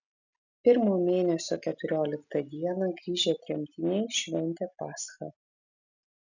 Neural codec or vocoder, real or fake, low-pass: none; real; 7.2 kHz